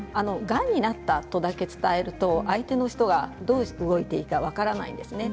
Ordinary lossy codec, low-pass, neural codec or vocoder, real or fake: none; none; none; real